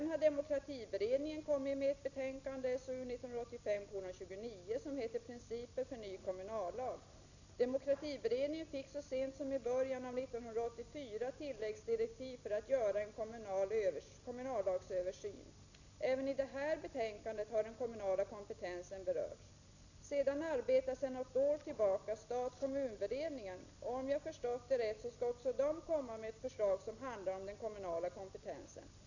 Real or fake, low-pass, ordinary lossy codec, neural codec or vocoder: real; 7.2 kHz; none; none